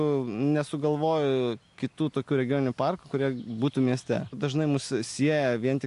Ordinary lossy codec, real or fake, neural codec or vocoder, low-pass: AAC, 64 kbps; real; none; 10.8 kHz